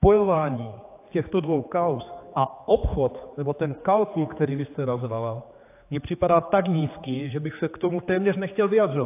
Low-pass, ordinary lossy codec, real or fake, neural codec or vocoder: 3.6 kHz; AAC, 24 kbps; fake; codec, 16 kHz, 4 kbps, X-Codec, HuBERT features, trained on general audio